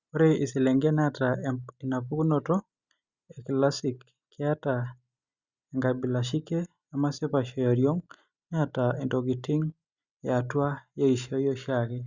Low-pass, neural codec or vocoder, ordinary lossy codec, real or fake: 7.2 kHz; none; Opus, 64 kbps; real